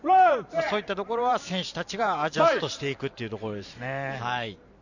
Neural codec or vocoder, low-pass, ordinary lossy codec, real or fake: vocoder, 44.1 kHz, 128 mel bands every 512 samples, BigVGAN v2; 7.2 kHz; none; fake